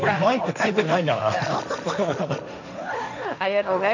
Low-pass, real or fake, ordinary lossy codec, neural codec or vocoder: 7.2 kHz; fake; none; codec, 16 kHz, 1.1 kbps, Voila-Tokenizer